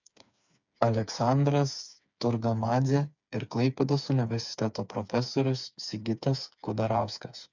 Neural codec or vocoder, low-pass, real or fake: codec, 16 kHz, 4 kbps, FreqCodec, smaller model; 7.2 kHz; fake